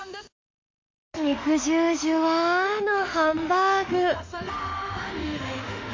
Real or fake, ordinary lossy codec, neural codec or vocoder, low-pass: fake; MP3, 48 kbps; autoencoder, 48 kHz, 32 numbers a frame, DAC-VAE, trained on Japanese speech; 7.2 kHz